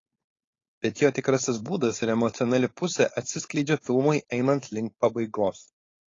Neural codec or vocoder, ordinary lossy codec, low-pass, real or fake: codec, 16 kHz, 4.8 kbps, FACodec; AAC, 32 kbps; 7.2 kHz; fake